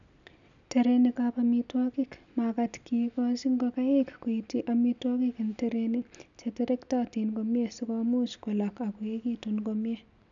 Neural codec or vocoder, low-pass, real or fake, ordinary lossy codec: none; 7.2 kHz; real; none